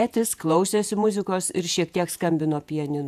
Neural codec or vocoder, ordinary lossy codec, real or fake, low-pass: vocoder, 48 kHz, 128 mel bands, Vocos; AAC, 96 kbps; fake; 14.4 kHz